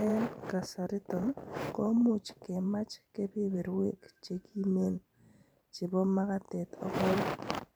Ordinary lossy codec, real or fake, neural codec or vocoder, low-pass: none; fake; vocoder, 44.1 kHz, 128 mel bands every 512 samples, BigVGAN v2; none